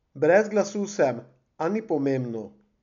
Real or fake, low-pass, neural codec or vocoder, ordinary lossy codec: real; 7.2 kHz; none; none